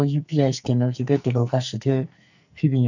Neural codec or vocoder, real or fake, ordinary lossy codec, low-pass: codec, 44.1 kHz, 2.6 kbps, SNAC; fake; none; 7.2 kHz